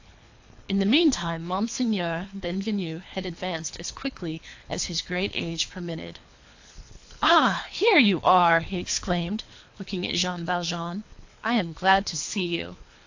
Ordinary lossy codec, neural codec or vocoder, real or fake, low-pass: AAC, 48 kbps; codec, 24 kHz, 3 kbps, HILCodec; fake; 7.2 kHz